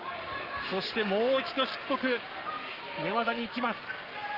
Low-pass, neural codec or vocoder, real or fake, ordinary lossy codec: 5.4 kHz; codec, 44.1 kHz, 7.8 kbps, Pupu-Codec; fake; Opus, 32 kbps